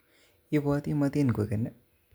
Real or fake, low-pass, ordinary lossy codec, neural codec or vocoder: real; none; none; none